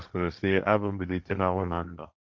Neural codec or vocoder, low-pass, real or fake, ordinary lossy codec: codec, 16 kHz, 1.1 kbps, Voila-Tokenizer; none; fake; none